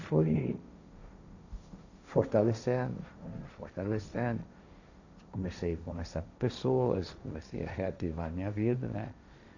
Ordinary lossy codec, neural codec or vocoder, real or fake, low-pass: none; codec, 16 kHz, 1.1 kbps, Voila-Tokenizer; fake; 7.2 kHz